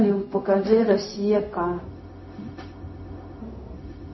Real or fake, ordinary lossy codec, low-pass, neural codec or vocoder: fake; MP3, 24 kbps; 7.2 kHz; codec, 16 kHz, 0.4 kbps, LongCat-Audio-Codec